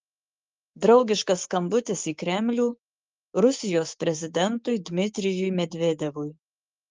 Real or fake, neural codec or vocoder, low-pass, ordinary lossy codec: fake; codec, 16 kHz, 6 kbps, DAC; 7.2 kHz; Opus, 24 kbps